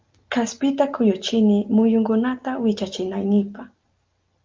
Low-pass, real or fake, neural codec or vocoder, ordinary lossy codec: 7.2 kHz; real; none; Opus, 24 kbps